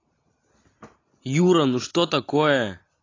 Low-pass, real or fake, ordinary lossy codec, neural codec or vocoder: 7.2 kHz; real; AAC, 32 kbps; none